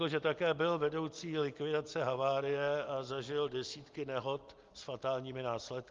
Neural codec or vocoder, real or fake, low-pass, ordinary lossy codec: none; real; 7.2 kHz; Opus, 32 kbps